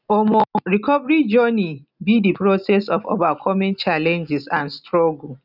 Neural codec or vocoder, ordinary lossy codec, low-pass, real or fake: none; none; 5.4 kHz; real